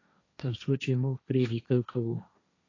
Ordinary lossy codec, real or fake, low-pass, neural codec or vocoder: AAC, 32 kbps; fake; 7.2 kHz; codec, 16 kHz, 1.1 kbps, Voila-Tokenizer